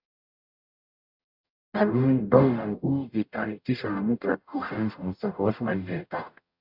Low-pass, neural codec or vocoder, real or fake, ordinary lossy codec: 5.4 kHz; codec, 44.1 kHz, 0.9 kbps, DAC; fake; AAC, 48 kbps